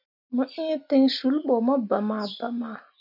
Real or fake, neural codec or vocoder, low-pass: real; none; 5.4 kHz